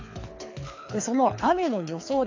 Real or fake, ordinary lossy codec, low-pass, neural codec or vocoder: fake; AAC, 48 kbps; 7.2 kHz; codec, 24 kHz, 6 kbps, HILCodec